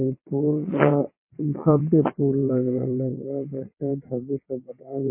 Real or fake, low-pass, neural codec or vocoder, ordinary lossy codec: fake; 3.6 kHz; vocoder, 22.05 kHz, 80 mel bands, WaveNeXt; MP3, 24 kbps